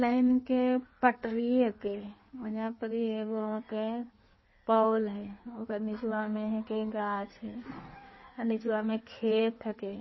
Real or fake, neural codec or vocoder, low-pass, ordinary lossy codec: fake; codec, 16 kHz in and 24 kHz out, 1.1 kbps, FireRedTTS-2 codec; 7.2 kHz; MP3, 24 kbps